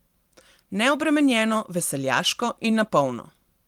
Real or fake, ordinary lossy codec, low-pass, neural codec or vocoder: fake; Opus, 24 kbps; 19.8 kHz; vocoder, 44.1 kHz, 128 mel bands every 512 samples, BigVGAN v2